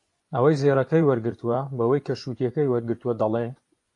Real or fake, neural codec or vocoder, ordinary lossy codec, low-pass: fake; vocoder, 24 kHz, 100 mel bands, Vocos; AAC, 48 kbps; 10.8 kHz